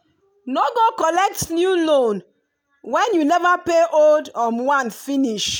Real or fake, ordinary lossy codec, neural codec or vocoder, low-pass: real; none; none; none